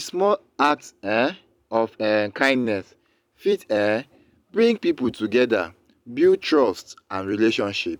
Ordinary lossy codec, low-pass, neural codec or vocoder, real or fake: none; 19.8 kHz; vocoder, 44.1 kHz, 128 mel bands every 256 samples, BigVGAN v2; fake